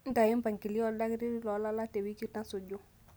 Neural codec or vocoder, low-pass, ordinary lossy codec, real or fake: none; none; none; real